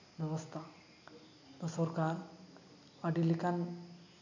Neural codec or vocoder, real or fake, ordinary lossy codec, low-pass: none; real; none; 7.2 kHz